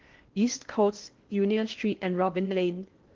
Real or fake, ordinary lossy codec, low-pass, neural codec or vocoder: fake; Opus, 16 kbps; 7.2 kHz; codec, 16 kHz in and 24 kHz out, 0.8 kbps, FocalCodec, streaming, 65536 codes